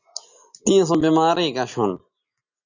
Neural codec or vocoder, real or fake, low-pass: none; real; 7.2 kHz